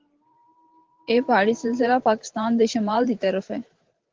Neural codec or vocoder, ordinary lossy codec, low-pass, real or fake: vocoder, 44.1 kHz, 128 mel bands every 512 samples, BigVGAN v2; Opus, 16 kbps; 7.2 kHz; fake